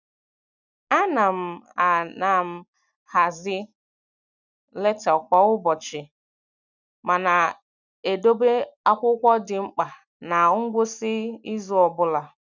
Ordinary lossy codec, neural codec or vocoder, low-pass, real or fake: none; none; 7.2 kHz; real